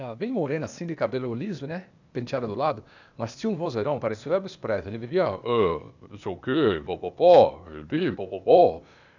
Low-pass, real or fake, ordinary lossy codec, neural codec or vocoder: 7.2 kHz; fake; none; codec, 16 kHz, 0.8 kbps, ZipCodec